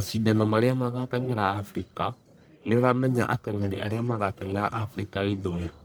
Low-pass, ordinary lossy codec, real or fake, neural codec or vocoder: none; none; fake; codec, 44.1 kHz, 1.7 kbps, Pupu-Codec